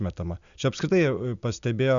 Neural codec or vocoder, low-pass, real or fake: none; 7.2 kHz; real